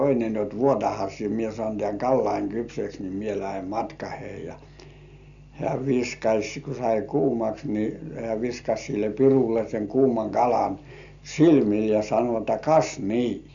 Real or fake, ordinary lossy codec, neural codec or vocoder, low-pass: real; none; none; 7.2 kHz